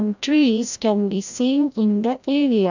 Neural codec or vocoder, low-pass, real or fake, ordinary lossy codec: codec, 16 kHz, 0.5 kbps, FreqCodec, larger model; 7.2 kHz; fake; none